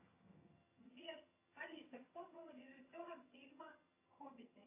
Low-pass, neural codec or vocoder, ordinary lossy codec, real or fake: 3.6 kHz; vocoder, 22.05 kHz, 80 mel bands, HiFi-GAN; AAC, 24 kbps; fake